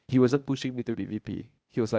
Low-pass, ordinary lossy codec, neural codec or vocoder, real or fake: none; none; codec, 16 kHz, 0.8 kbps, ZipCodec; fake